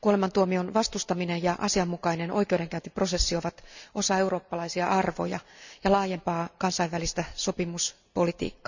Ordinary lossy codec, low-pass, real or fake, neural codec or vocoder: none; 7.2 kHz; real; none